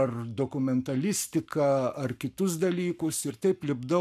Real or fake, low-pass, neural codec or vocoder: fake; 14.4 kHz; codec, 44.1 kHz, 7.8 kbps, Pupu-Codec